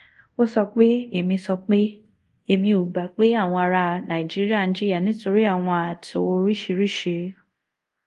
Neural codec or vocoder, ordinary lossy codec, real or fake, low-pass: codec, 24 kHz, 0.5 kbps, DualCodec; Opus, 24 kbps; fake; 10.8 kHz